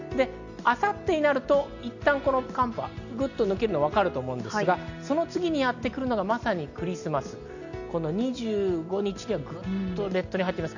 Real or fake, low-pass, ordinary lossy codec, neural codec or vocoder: real; 7.2 kHz; none; none